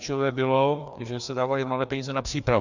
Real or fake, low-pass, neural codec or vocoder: fake; 7.2 kHz; codec, 16 kHz, 2 kbps, FreqCodec, larger model